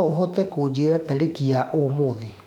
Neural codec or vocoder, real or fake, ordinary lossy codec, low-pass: autoencoder, 48 kHz, 32 numbers a frame, DAC-VAE, trained on Japanese speech; fake; none; 19.8 kHz